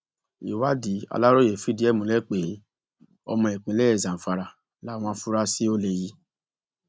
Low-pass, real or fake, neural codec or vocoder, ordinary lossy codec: none; real; none; none